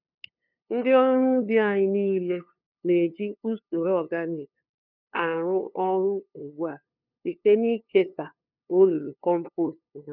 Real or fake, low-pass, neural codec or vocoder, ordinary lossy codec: fake; 5.4 kHz; codec, 16 kHz, 2 kbps, FunCodec, trained on LibriTTS, 25 frames a second; none